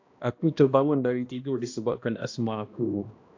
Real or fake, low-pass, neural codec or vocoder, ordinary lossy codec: fake; 7.2 kHz; codec, 16 kHz, 1 kbps, X-Codec, HuBERT features, trained on balanced general audio; AAC, 48 kbps